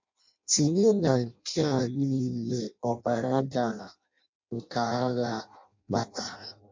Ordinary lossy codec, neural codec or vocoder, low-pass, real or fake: MP3, 48 kbps; codec, 16 kHz in and 24 kHz out, 0.6 kbps, FireRedTTS-2 codec; 7.2 kHz; fake